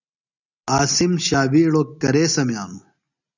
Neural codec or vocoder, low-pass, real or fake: none; 7.2 kHz; real